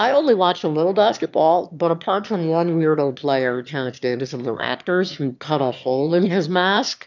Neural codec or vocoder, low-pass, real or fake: autoencoder, 22.05 kHz, a latent of 192 numbers a frame, VITS, trained on one speaker; 7.2 kHz; fake